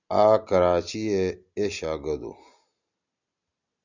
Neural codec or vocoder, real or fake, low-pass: none; real; 7.2 kHz